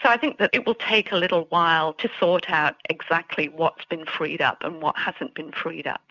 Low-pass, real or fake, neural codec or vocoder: 7.2 kHz; real; none